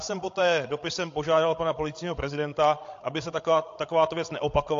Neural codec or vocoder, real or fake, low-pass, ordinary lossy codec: codec, 16 kHz, 16 kbps, FreqCodec, larger model; fake; 7.2 kHz; AAC, 48 kbps